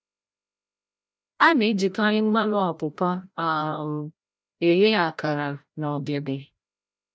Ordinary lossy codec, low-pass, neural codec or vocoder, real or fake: none; none; codec, 16 kHz, 0.5 kbps, FreqCodec, larger model; fake